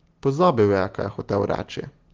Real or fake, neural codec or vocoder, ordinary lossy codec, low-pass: real; none; Opus, 16 kbps; 7.2 kHz